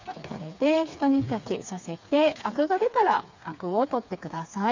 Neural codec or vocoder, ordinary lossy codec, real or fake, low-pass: codec, 16 kHz, 4 kbps, FreqCodec, smaller model; MP3, 64 kbps; fake; 7.2 kHz